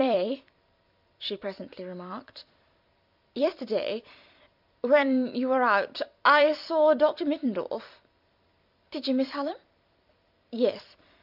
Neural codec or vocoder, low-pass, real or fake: vocoder, 44.1 kHz, 128 mel bands every 256 samples, BigVGAN v2; 5.4 kHz; fake